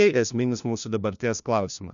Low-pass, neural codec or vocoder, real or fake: 7.2 kHz; codec, 16 kHz, 1 kbps, FunCodec, trained on LibriTTS, 50 frames a second; fake